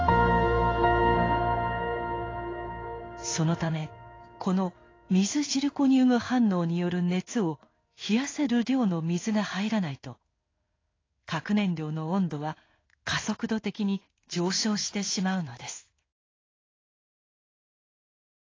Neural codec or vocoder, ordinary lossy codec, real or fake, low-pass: codec, 16 kHz in and 24 kHz out, 1 kbps, XY-Tokenizer; AAC, 32 kbps; fake; 7.2 kHz